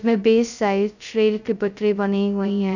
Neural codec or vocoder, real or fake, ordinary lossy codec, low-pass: codec, 16 kHz, 0.2 kbps, FocalCodec; fake; none; 7.2 kHz